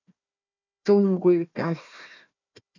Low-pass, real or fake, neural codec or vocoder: 7.2 kHz; fake; codec, 16 kHz, 1 kbps, FunCodec, trained on Chinese and English, 50 frames a second